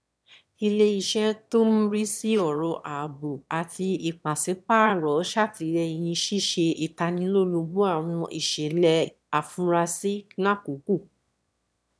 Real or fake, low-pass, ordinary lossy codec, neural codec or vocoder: fake; none; none; autoencoder, 22.05 kHz, a latent of 192 numbers a frame, VITS, trained on one speaker